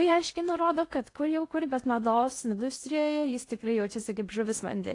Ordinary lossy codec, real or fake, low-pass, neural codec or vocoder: AAC, 48 kbps; fake; 10.8 kHz; codec, 16 kHz in and 24 kHz out, 0.8 kbps, FocalCodec, streaming, 65536 codes